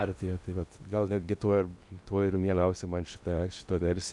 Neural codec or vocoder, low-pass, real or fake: codec, 16 kHz in and 24 kHz out, 0.8 kbps, FocalCodec, streaming, 65536 codes; 10.8 kHz; fake